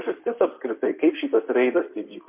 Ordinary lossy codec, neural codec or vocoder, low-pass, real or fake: MP3, 32 kbps; codec, 16 kHz in and 24 kHz out, 2.2 kbps, FireRedTTS-2 codec; 3.6 kHz; fake